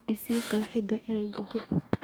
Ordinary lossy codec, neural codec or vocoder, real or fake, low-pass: none; codec, 44.1 kHz, 2.6 kbps, DAC; fake; none